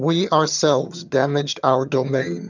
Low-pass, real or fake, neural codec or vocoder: 7.2 kHz; fake; vocoder, 22.05 kHz, 80 mel bands, HiFi-GAN